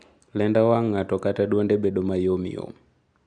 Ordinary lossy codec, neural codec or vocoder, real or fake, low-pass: none; none; real; 9.9 kHz